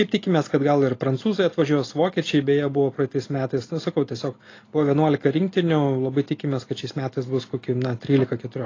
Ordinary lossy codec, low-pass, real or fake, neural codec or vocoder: AAC, 32 kbps; 7.2 kHz; real; none